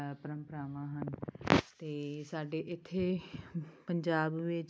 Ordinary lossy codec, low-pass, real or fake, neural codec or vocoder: none; none; real; none